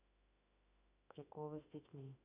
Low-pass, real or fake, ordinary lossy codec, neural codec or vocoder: 3.6 kHz; fake; none; autoencoder, 48 kHz, 32 numbers a frame, DAC-VAE, trained on Japanese speech